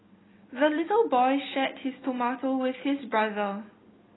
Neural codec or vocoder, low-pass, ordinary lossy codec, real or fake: none; 7.2 kHz; AAC, 16 kbps; real